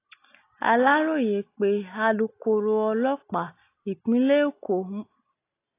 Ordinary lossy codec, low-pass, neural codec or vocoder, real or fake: AAC, 24 kbps; 3.6 kHz; none; real